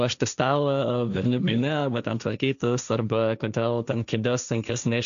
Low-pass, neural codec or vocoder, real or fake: 7.2 kHz; codec, 16 kHz, 1.1 kbps, Voila-Tokenizer; fake